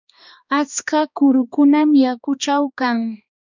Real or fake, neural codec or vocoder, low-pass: fake; codec, 16 kHz, 2 kbps, X-Codec, HuBERT features, trained on balanced general audio; 7.2 kHz